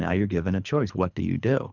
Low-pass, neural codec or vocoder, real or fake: 7.2 kHz; codec, 24 kHz, 3 kbps, HILCodec; fake